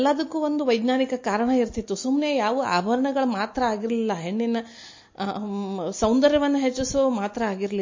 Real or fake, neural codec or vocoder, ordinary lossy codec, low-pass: real; none; MP3, 32 kbps; 7.2 kHz